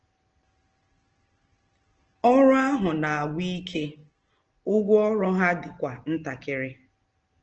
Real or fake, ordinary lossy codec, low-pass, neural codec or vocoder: real; Opus, 16 kbps; 7.2 kHz; none